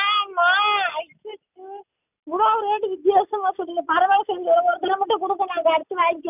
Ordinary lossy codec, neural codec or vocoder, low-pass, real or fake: none; none; 3.6 kHz; real